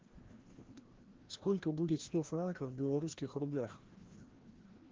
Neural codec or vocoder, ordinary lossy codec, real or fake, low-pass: codec, 16 kHz, 1 kbps, FreqCodec, larger model; Opus, 16 kbps; fake; 7.2 kHz